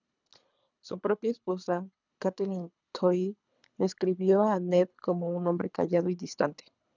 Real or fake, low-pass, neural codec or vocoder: fake; 7.2 kHz; codec, 24 kHz, 3 kbps, HILCodec